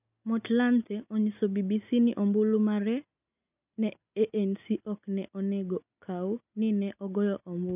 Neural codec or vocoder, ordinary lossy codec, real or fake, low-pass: none; none; real; 3.6 kHz